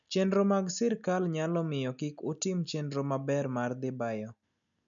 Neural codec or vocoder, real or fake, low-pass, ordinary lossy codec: none; real; 7.2 kHz; none